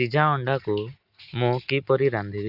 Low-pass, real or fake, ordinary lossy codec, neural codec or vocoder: 5.4 kHz; real; Opus, 64 kbps; none